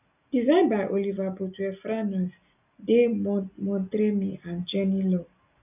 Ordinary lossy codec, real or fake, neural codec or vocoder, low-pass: none; real; none; 3.6 kHz